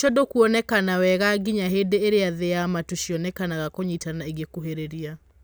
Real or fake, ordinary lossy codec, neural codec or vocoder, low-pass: real; none; none; none